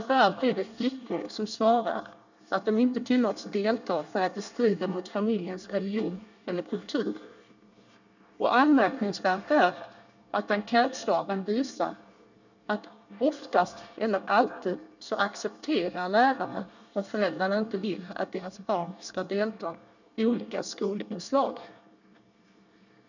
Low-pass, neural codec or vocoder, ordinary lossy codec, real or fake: 7.2 kHz; codec, 24 kHz, 1 kbps, SNAC; none; fake